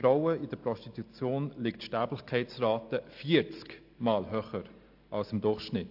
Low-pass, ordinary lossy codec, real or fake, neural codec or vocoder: 5.4 kHz; AAC, 48 kbps; real; none